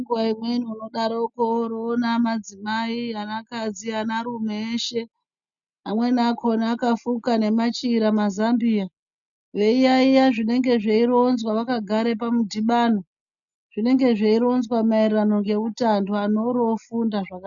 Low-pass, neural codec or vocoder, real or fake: 7.2 kHz; none; real